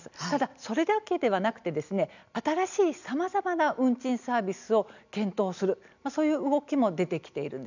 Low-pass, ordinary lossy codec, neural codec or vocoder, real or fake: 7.2 kHz; none; none; real